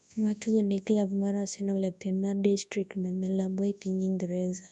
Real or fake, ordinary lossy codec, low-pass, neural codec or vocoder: fake; none; 10.8 kHz; codec, 24 kHz, 0.9 kbps, WavTokenizer, large speech release